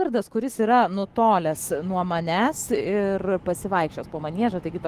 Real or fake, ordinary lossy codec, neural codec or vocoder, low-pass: fake; Opus, 16 kbps; autoencoder, 48 kHz, 128 numbers a frame, DAC-VAE, trained on Japanese speech; 14.4 kHz